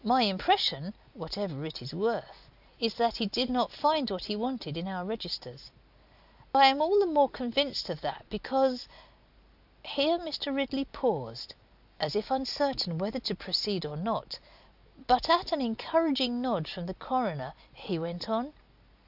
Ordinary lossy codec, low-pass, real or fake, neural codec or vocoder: AAC, 48 kbps; 5.4 kHz; real; none